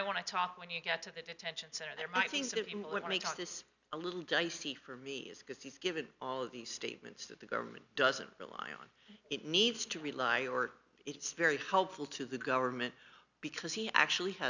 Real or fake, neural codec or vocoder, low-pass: real; none; 7.2 kHz